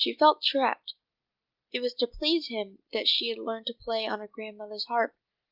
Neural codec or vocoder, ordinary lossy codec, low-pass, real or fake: none; Opus, 24 kbps; 5.4 kHz; real